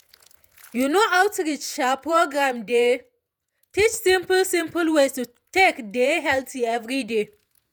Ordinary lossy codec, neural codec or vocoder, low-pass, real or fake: none; vocoder, 48 kHz, 128 mel bands, Vocos; none; fake